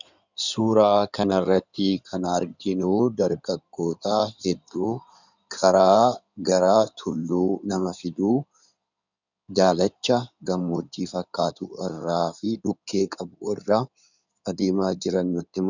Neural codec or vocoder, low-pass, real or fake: codec, 16 kHz in and 24 kHz out, 2.2 kbps, FireRedTTS-2 codec; 7.2 kHz; fake